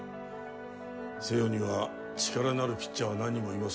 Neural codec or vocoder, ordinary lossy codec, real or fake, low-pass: none; none; real; none